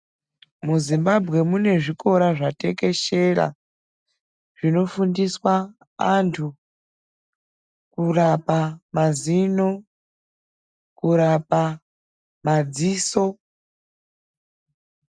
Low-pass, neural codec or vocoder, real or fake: 9.9 kHz; none; real